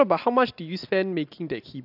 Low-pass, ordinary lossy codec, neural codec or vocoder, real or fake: 5.4 kHz; AAC, 48 kbps; none; real